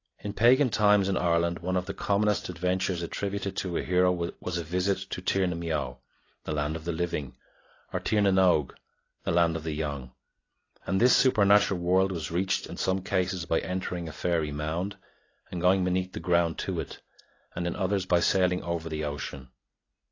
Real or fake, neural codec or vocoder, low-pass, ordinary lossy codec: real; none; 7.2 kHz; AAC, 32 kbps